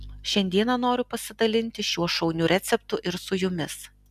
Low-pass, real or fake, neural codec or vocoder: 14.4 kHz; real; none